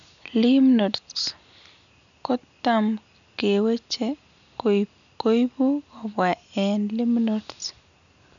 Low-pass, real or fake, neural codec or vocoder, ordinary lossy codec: 7.2 kHz; real; none; none